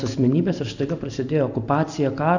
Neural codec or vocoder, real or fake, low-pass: none; real; 7.2 kHz